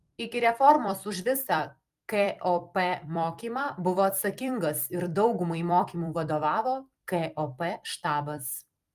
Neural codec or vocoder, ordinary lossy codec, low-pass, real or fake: vocoder, 44.1 kHz, 128 mel bands every 256 samples, BigVGAN v2; Opus, 32 kbps; 14.4 kHz; fake